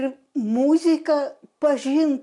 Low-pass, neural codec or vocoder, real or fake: 10.8 kHz; none; real